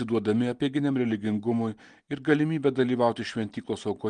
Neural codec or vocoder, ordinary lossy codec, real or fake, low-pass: none; Opus, 24 kbps; real; 9.9 kHz